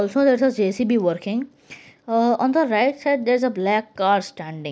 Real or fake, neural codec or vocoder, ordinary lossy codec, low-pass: real; none; none; none